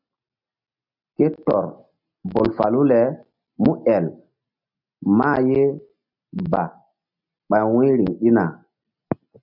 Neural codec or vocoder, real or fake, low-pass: none; real; 5.4 kHz